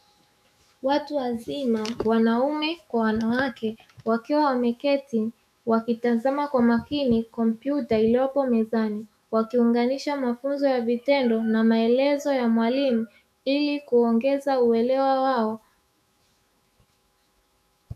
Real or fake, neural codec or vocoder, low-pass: fake; autoencoder, 48 kHz, 128 numbers a frame, DAC-VAE, trained on Japanese speech; 14.4 kHz